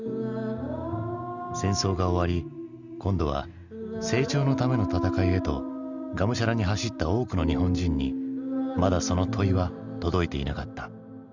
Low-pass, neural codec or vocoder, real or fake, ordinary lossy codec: 7.2 kHz; none; real; Opus, 64 kbps